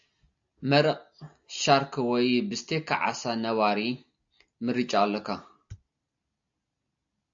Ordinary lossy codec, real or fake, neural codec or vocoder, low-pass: AAC, 64 kbps; real; none; 7.2 kHz